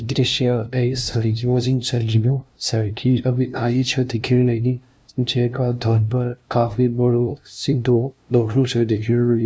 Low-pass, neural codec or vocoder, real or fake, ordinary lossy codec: none; codec, 16 kHz, 0.5 kbps, FunCodec, trained on LibriTTS, 25 frames a second; fake; none